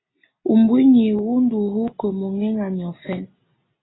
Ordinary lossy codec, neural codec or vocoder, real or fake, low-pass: AAC, 16 kbps; none; real; 7.2 kHz